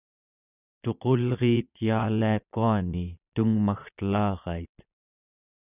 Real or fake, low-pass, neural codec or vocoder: fake; 3.6 kHz; vocoder, 22.05 kHz, 80 mel bands, Vocos